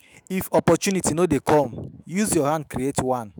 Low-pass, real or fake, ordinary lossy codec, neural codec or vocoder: none; fake; none; autoencoder, 48 kHz, 128 numbers a frame, DAC-VAE, trained on Japanese speech